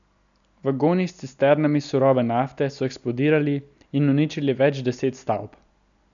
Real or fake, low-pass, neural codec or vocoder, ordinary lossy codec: real; 7.2 kHz; none; none